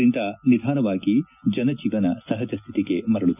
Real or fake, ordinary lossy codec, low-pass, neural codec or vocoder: real; none; 3.6 kHz; none